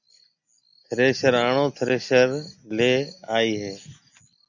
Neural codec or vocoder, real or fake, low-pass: none; real; 7.2 kHz